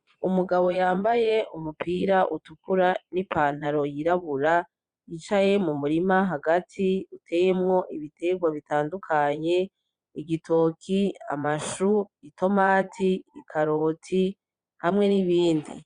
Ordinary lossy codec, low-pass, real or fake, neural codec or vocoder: Opus, 64 kbps; 9.9 kHz; fake; vocoder, 22.05 kHz, 80 mel bands, Vocos